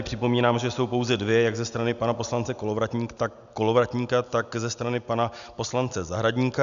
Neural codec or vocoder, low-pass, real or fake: none; 7.2 kHz; real